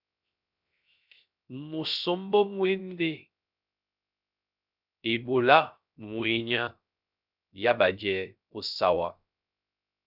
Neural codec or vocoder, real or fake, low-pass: codec, 16 kHz, 0.3 kbps, FocalCodec; fake; 5.4 kHz